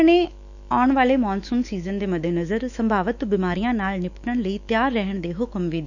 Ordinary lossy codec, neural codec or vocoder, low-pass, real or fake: none; autoencoder, 48 kHz, 128 numbers a frame, DAC-VAE, trained on Japanese speech; 7.2 kHz; fake